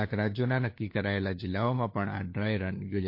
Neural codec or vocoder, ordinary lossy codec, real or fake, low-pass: codec, 16 kHz, 4 kbps, FunCodec, trained on LibriTTS, 50 frames a second; MP3, 32 kbps; fake; 5.4 kHz